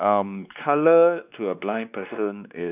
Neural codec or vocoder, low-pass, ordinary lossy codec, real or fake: codec, 16 kHz, 2 kbps, X-Codec, WavLM features, trained on Multilingual LibriSpeech; 3.6 kHz; none; fake